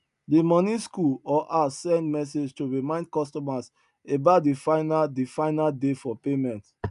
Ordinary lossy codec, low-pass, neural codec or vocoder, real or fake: none; 10.8 kHz; none; real